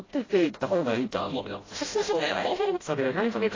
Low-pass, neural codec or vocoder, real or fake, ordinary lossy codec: 7.2 kHz; codec, 16 kHz, 0.5 kbps, FreqCodec, smaller model; fake; AAC, 32 kbps